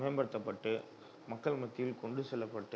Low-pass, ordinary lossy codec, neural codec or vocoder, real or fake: none; none; none; real